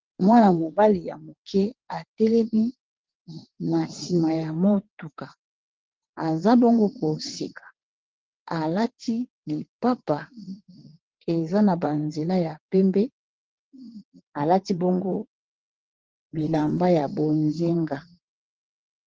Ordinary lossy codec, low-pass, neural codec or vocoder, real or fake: Opus, 16 kbps; 7.2 kHz; vocoder, 22.05 kHz, 80 mel bands, WaveNeXt; fake